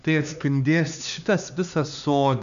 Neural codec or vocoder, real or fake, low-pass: codec, 16 kHz, 2 kbps, X-Codec, HuBERT features, trained on LibriSpeech; fake; 7.2 kHz